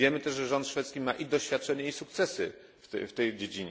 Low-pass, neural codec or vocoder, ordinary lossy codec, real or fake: none; none; none; real